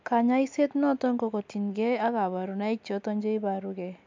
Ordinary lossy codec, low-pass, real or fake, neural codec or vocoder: MP3, 64 kbps; 7.2 kHz; real; none